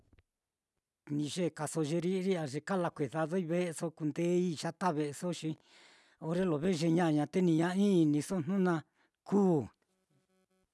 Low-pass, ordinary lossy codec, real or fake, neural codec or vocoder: none; none; real; none